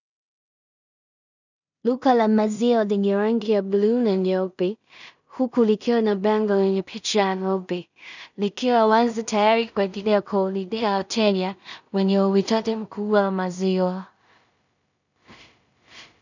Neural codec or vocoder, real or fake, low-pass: codec, 16 kHz in and 24 kHz out, 0.4 kbps, LongCat-Audio-Codec, two codebook decoder; fake; 7.2 kHz